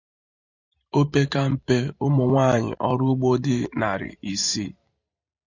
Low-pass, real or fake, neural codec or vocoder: 7.2 kHz; fake; vocoder, 44.1 kHz, 128 mel bands every 512 samples, BigVGAN v2